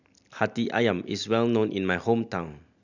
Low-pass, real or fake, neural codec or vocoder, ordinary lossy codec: 7.2 kHz; real; none; none